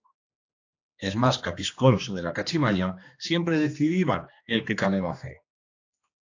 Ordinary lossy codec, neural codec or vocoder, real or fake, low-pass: AAC, 48 kbps; codec, 16 kHz, 2 kbps, X-Codec, HuBERT features, trained on general audio; fake; 7.2 kHz